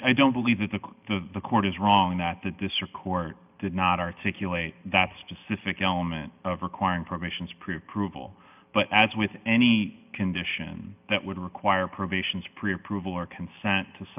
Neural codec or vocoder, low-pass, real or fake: none; 3.6 kHz; real